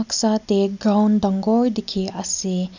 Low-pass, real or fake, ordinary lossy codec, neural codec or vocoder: 7.2 kHz; real; none; none